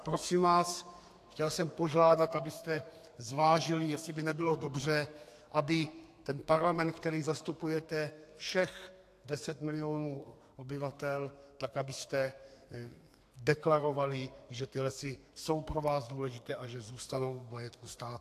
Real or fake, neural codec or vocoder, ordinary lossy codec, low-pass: fake; codec, 32 kHz, 1.9 kbps, SNAC; AAC, 64 kbps; 14.4 kHz